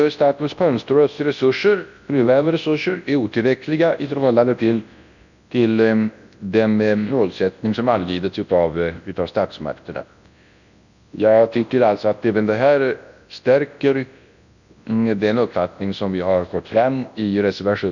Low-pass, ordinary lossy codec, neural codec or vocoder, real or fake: 7.2 kHz; none; codec, 24 kHz, 0.9 kbps, WavTokenizer, large speech release; fake